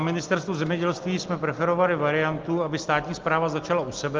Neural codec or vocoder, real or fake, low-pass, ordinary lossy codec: none; real; 7.2 kHz; Opus, 24 kbps